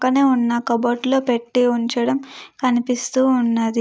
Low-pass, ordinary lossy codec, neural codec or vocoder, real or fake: none; none; none; real